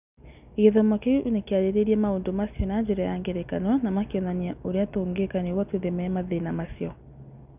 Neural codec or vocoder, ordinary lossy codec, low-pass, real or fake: none; none; 3.6 kHz; real